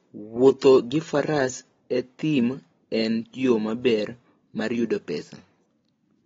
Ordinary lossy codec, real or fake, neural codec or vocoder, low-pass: AAC, 24 kbps; real; none; 7.2 kHz